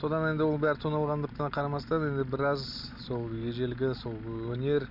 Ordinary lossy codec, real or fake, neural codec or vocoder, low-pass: none; real; none; 5.4 kHz